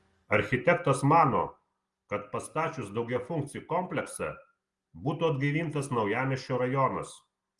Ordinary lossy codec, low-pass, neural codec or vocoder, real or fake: Opus, 24 kbps; 10.8 kHz; none; real